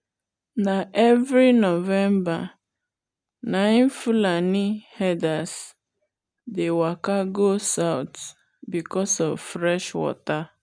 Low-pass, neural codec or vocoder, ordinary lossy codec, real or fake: 9.9 kHz; none; none; real